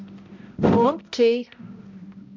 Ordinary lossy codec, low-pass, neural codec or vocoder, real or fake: MP3, 64 kbps; 7.2 kHz; codec, 16 kHz, 0.5 kbps, X-Codec, HuBERT features, trained on balanced general audio; fake